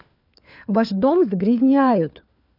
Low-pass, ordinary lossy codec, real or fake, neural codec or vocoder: 5.4 kHz; none; fake; codec, 16 kHz, 4 kbps, FunCodec, trained on LibriTTS, 50 frames a second